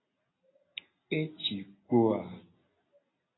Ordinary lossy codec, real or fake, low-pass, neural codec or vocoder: AAC, 16 kbps; real; 7.2 kHz; none